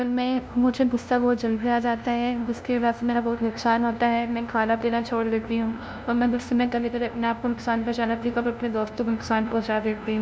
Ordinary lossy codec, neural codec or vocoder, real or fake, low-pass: none; codec, 16 kHz, 0.5 kbps, FunCodec, trained on LibriTTS, 25 frames a second; fake; none